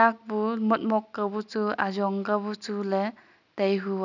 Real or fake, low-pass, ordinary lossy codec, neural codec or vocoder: real; 7.2 kHz; none; none